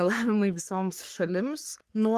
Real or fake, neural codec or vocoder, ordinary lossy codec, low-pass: fake; codec, 44.1 kHz, 3.4 kbps, Pupu-Codec; Opus, 24 kbps; 14.4 kHz